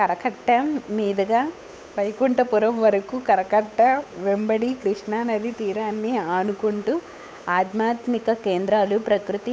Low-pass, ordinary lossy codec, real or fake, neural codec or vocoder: none; none; fake; codec, 16 kHz, 8 kbps, FunCodec, trained on Chinese and English, 25 frames a second